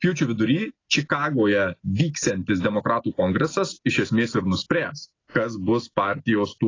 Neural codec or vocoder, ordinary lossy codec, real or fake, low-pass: none; AAC, 32 kbps; real; 7.2 kHz